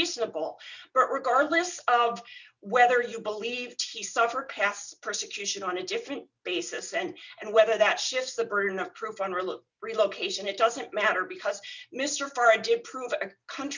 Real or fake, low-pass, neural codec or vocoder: real; 7.2 kHz; none